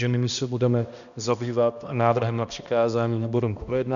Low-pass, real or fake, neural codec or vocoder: 7.2 kHz; fake; codec, 16 kHz, 1 kbps, X-Codec, HuBERT features, trained on balanced general audio